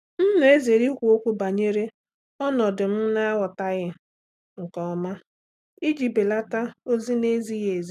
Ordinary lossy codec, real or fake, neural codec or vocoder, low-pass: none; real; none; 14.4 kHz